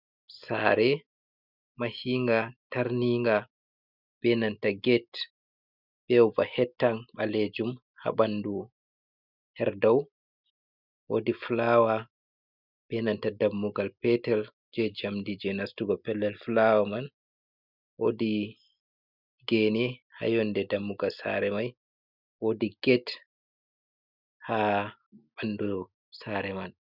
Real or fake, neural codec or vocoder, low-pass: real; none; 5.4 kHz